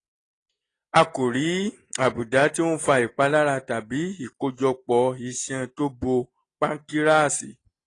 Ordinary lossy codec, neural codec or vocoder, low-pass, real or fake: AAC, 32 kbps; none; 10.8 kHz; real